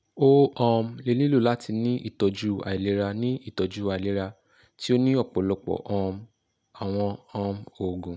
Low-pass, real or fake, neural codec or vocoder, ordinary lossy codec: none; real; none; none